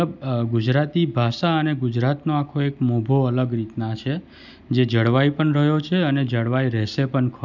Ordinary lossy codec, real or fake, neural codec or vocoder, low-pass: none; real; none; 7.2 kHz